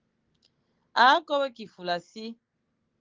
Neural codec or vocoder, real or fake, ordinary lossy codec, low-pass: none; real; Opus, 16 kbps; 7.2 kHz